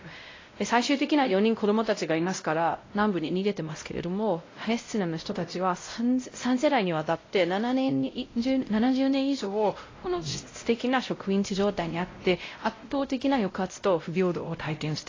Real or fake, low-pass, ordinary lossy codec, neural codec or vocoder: fake; 7.2 kHz; AAC, 32 kbps; codec, 16 kHz, 0.5 kbps, X-Codec, WavLM features, trained on Multilingual LibriSpeech